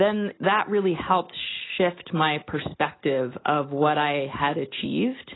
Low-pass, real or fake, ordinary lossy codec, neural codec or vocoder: 7.2 kHz; real; AAC, 16 kbps; none